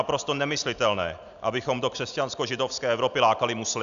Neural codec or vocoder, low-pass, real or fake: none; 7.2 kHz; real